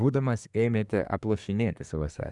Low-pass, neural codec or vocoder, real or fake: 10.8 kHz; codec, 24 kHz, 1 kbps, SNAC; fake